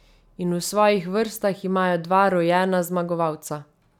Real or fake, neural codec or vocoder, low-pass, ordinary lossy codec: real; none; 19.8 kHz; none